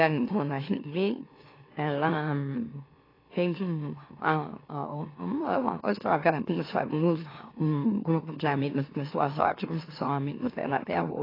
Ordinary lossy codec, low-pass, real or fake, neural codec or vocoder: AAC, 24 kbps; 5.4 kHz; fake; autoencoder, 44.1 kHz, a latent of 192 numbers a frame, MeloTTS